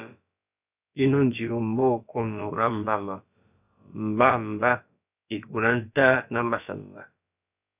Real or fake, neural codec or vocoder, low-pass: fake; codec, 16 kHz, about 1 kbps, DyCAST, with the encoder's durations; 3.6 kHz